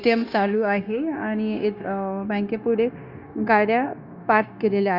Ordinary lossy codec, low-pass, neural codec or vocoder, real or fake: Opus, 64 kbps; 5.4 kHz; codec, 16 kHz, 0.9 kbps, LongCat-Audio-Codec; fake